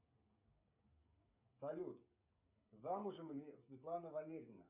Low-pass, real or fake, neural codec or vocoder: 3.6 kHz; fake; codec, 16 kHz, 16 kbps, FreqCodec, smaller model